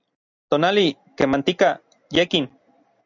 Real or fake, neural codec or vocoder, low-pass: real; none; 7.2 kHz